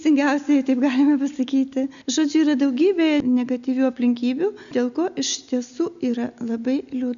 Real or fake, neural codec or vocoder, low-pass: real; none; 7.2 kHz